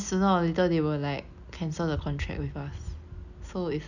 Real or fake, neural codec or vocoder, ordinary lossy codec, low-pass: real; none; none; 7.2 kHz